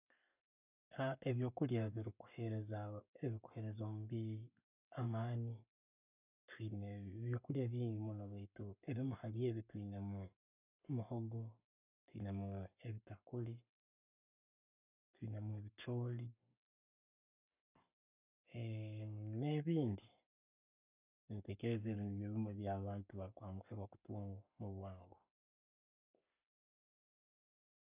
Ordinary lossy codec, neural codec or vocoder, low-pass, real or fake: none; codec, 44.1 kHz, 7.8 kbps, Pupu-Codec; 3.6 kHz; fake